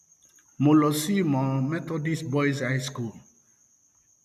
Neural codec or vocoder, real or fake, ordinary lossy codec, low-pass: none; real; none; 14.4 kHz